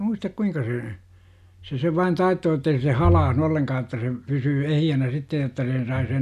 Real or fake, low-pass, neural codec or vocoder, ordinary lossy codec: real; 14.4 kHz; none; none